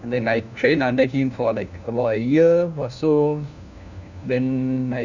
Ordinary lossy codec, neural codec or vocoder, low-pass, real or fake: none; codec, 16 kHz, 1 kbps, FunCodec, trained on LibriTTS, 50 frames a second; 7.2 kHz; fake